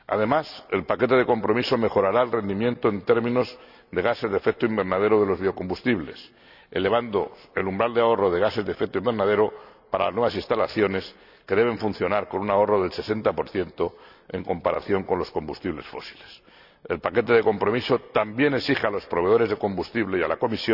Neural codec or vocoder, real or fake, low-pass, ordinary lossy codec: none; real; 5.4 kHz; none